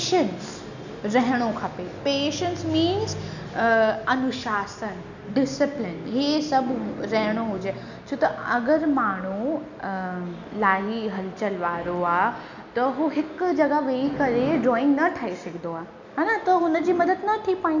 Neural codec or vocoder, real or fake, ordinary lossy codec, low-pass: none; real; none; 7.2 kHz